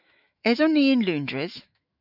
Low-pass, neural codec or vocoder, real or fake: 5.4 kHz; autoencoder, 48 kHz, 128 numbers a frame, DAC-VAE, trained on Japanese speech; fake